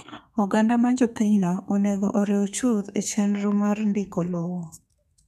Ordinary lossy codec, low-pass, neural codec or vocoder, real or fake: none; 14.4 kHz; codec, 32 kHz, 1.9 kbps, SNAC; fake